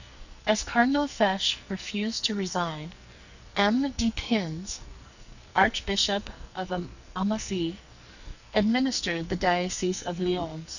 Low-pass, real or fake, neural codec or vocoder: 7.2 kHz; fake; codec, 44.1 kHz, 2.6 kbps, SNAC